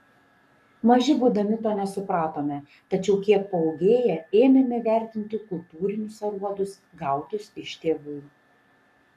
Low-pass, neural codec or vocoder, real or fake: 14.4 kHz; codec, 44.1 kHz, 7.8 kbps, Pupu-Codec; fake